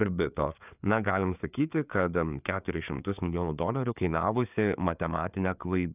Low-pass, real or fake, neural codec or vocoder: 3.6 kHz; fake; codec, 16 kHz, 4 kbps, FreqCodec, larger model